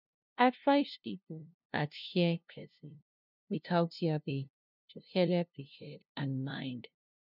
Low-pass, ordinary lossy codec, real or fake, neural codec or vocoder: 5.4 kHz; none; fake; codec, 16 kHz, 0.5 kbps, FunCodec, trained on LibriTTS, 25 frames a second